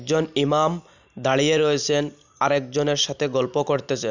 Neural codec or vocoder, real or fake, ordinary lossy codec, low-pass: none; real; none; 7.2 kHz